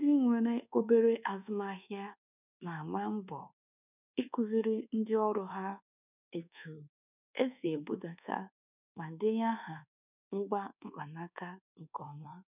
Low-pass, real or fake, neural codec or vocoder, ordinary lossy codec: 3.6 kHz; fake; codec, 24 kHz, 1.2 kbps, DualCodec; none